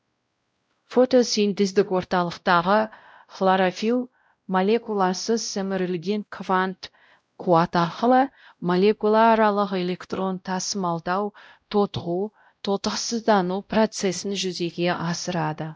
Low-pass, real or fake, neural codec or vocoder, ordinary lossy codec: none; fake; codec, 16 kHz, 0.5 kbps, X-Codec, WavLM features, trained on Multilingual LibriSpeech; none